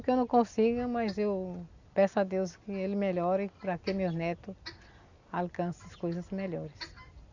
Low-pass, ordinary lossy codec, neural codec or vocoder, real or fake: 7.2 kHz; Opus, 64 kbps; none; real